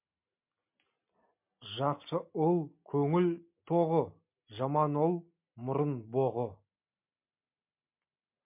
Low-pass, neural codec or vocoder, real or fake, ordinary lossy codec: 3.6 kHz; none; real; MP3, 32 kbps